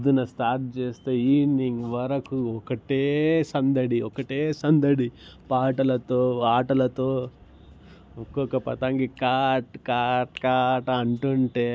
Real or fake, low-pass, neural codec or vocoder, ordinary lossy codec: real; none; none; none